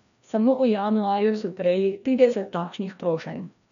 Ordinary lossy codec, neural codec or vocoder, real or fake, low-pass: none; codec, 16 kHz, 1 kbps, FreqCodec, larger model; fake; 7.2 kHz